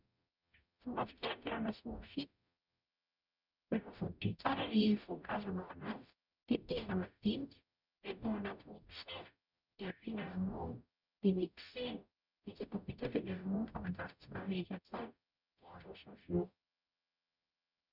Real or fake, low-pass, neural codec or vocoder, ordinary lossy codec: fake; 5.4 kHz; codec, 44.1 kHz, 0.9 kbps, DAC; none